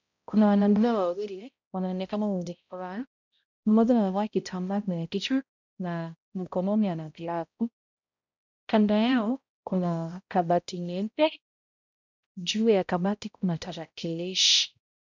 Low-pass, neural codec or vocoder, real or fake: 7.2 kHz; codec, 16 kHz, 0.5 kbps, X-Codec, HuBERT features, trained on balanced general audio; fake